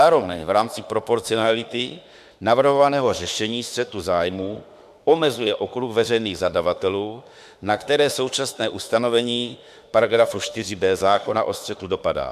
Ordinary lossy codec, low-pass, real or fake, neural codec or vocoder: MP3, 96 kbps; 14.4 kHz; fake; autoencoder, 48 kHz, 32 numbers a frame, DAC-VAE, trained on Japanese speech